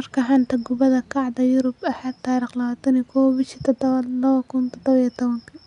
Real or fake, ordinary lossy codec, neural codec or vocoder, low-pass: real; none; none; 10.8 kHz